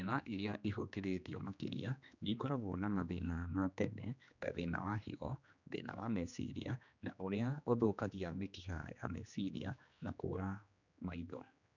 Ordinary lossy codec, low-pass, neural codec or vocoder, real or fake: none; 7.2 kHz; codec, 16 kHz, 2 kbps, X-Codec, HuBERT features, trained on general audio; fake